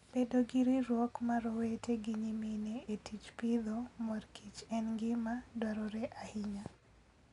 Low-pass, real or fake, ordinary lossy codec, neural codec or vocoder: 10.8 kHz; real; none; none